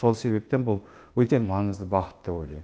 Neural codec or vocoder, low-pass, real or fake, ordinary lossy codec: codec, 16 kHz, 0.8 kbps, ZipCodec; none; fake; none